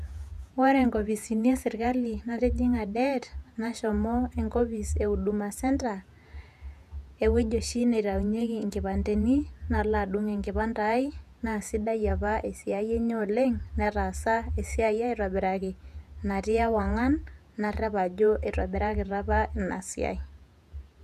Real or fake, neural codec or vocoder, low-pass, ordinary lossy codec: fake; vocoder, 48 kHz, 128 mel bands, Vocos; 14.4 kHz; none